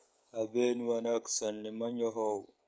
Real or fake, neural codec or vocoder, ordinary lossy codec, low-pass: fake; codec, 16 kHz, 16 kbps, FreqCodec, smaller model; none; none